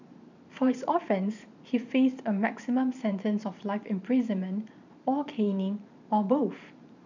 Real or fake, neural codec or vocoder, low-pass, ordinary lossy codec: fake; vocoder, 44.1 kHz, 128 mel bands every 512 samples, BigVGAN v2; 7.2 kHz; none